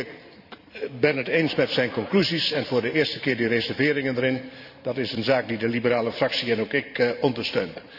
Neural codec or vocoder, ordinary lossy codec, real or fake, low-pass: vocoder, 44.1 kHz, 128 mel bands every 512 samples, BigVGAN v2; none; fake; 5.4 kHz